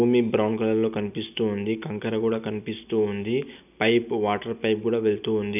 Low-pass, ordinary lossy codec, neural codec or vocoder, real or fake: 3.6 kHz; none; none; real